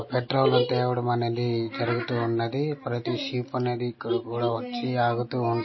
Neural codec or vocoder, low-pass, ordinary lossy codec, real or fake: none; 7.2 kHz; MP3, 24 kbps; real